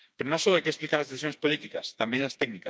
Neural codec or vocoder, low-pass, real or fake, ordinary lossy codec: codec, 16 kHz, 2 kbps, FreqCodec, smaller model; none; fake; none